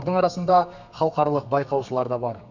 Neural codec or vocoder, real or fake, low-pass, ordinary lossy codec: codec, 32 kHz, 1.9 kbps, SNAC; fake; 7.2 kHz; none